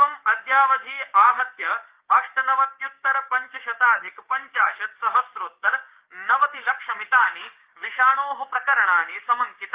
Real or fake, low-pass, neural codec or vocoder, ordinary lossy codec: fake; 3.6 kHz; codec, 16 kHz, 6 kbps, DAC; Opus, 32 kbps